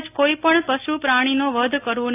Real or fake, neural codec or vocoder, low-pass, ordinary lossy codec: real; none; 3.6 kHz; AAC, 32 kbps